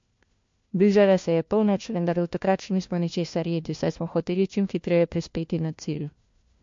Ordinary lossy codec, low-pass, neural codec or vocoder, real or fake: MP3, 48 kbps; 7.2 kHz; codec, 16 kHz, 1 kbps, FunCodec, trained on LibriTTS, 50 frames a second; fake